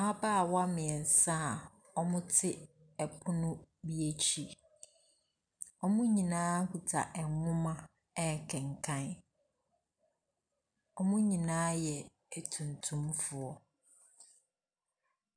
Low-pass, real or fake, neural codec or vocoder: 9.9 kHz; real; none